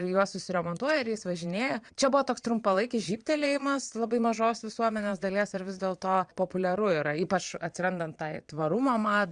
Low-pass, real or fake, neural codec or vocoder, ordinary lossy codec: 9.9 kHz; fake; vocoder, 22.05 kHz, 80 mel bands, Vocos; Opus, 64 kbps